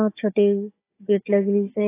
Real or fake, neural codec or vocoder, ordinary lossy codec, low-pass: fake; codec, 16 kHz, 16 kbps, FunCodec, trained on Chinese and English, 50 frames a second; AAC, 16 kbps; 3.6 kHz